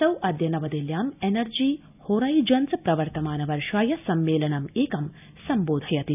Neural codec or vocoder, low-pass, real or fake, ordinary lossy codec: none; 3.6 kHz; real; none